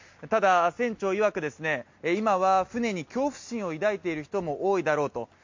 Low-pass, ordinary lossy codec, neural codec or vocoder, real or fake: 7.2 kHz; MP3, 48 kbps; none; real